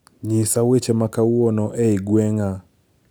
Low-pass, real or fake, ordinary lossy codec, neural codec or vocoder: none; real; none; none